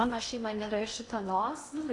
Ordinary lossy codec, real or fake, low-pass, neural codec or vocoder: AAC, 48 kbps; fake; 10.8 kHz; codec, 16 kHz in and 24 kHz out, 0.8 kbps, FocalCodec, streaming, 65536 codes